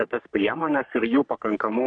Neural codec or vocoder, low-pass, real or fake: codec, 44.1 kHz, 3.4 kbps, Pupu-Codec; 9.9 kHz; fake